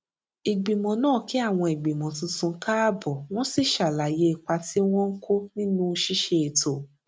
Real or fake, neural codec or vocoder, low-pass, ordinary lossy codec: real; none; none; none